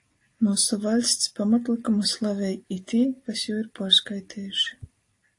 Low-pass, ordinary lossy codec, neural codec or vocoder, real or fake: 10.8 kHz; AAC, 48 kbps; none; real